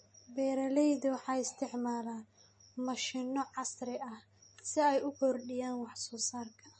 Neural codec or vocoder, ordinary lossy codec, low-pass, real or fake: none; MP3, 32 kbps; 10.8 kHz; real